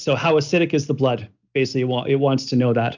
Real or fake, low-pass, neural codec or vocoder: real; 7.2 kHz; none